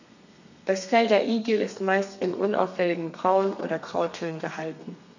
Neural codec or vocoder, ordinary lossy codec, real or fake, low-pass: codec, 32 kHz, 1.9 kbps, SNAC; none; fake; 7.2 kHz